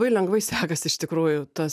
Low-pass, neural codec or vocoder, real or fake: 14.4 kHz; none; real